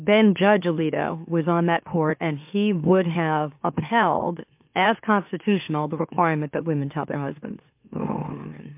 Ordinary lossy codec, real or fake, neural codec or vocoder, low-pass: MP3, 32 kbps; fake; autoencoder, 44.1 kHz, a latent of 192 numbers a frame, MeloTTS; 3.6 kHz